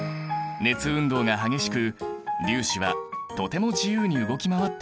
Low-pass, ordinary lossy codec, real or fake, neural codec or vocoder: none; none; real; none